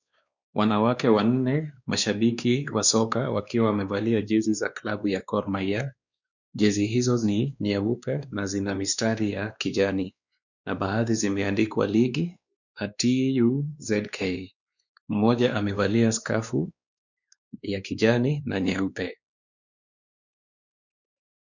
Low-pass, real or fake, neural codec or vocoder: 7.2 kHz; fake; codec, 16 kHz, 2 kbps, X-Codec, WavLM features, trained on Multilingual LibriSpeech